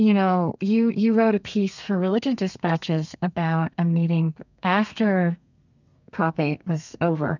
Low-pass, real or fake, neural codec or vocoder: 7.2 kHz; fake; codec, 32 kHz, 1.9 kbps, SNAC